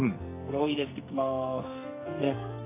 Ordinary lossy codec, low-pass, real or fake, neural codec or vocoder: AAC, 16 kbps; 3.6 kHz; fake; codec, 44.1 kHz, 2.6 kbps, SNAC